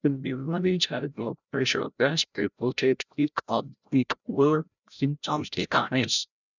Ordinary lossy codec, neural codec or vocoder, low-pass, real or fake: none; codec, 16 kHz, 0.5 kbps, FreqCodec, larger model; 7.2 kHz; fake